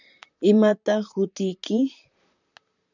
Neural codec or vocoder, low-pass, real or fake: vocoder, 44.1 kHz, 128 mel bands, Pupu-Vocoder; 7.2 kHz; fake